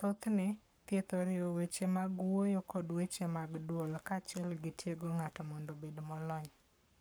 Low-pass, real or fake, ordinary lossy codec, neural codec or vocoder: none; fake; none; codec, 44.1 kHz, 7.8 kbps, Pupu-Codec